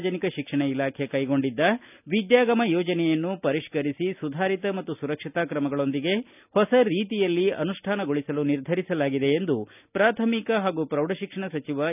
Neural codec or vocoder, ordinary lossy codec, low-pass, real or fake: none; none; 3.6 kHz; real